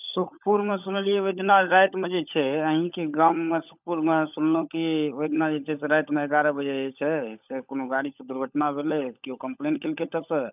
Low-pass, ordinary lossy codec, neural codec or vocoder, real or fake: 3.6 kHz; none; codec, 16 kHz, 16 kbps, FunCodec, trained on LibriTTS, 50 frames a second; fake